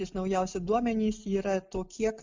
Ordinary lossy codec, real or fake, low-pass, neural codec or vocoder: MP3, 64 kbps; real; 7.2 kHz; none